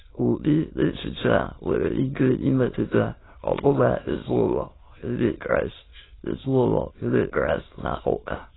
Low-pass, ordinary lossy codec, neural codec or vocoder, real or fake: 7.2 kHz; AAC, 16 kbps; autoencoder, 22.05 kHz, a latent of 192 numbers a frame, VITS, trained on many speakers; fake